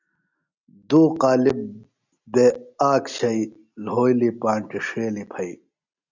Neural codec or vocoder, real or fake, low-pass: none; real; 7.2 kHz